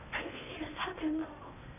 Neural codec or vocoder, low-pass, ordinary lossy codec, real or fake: codec, 16 kHz in and 24 kHz out, 0.8 kbps, FocalCodec, streaming, 65536 codes; 3.6 kHz; none; fake